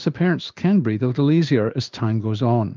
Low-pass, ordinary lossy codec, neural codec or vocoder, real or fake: 7.2 kHz; Opus, 24 kbps; codec, 16 kHz in and 24 kHz out, 1 kbps, XY-Tokenizer; fake